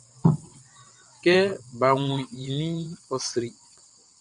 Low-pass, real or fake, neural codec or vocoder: 9.9 kHz; fake; vocoder, 22.05 kHz, 80 mel bands, WaveNeXt